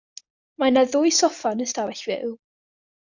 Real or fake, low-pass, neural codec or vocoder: real; 7.2 kHz; none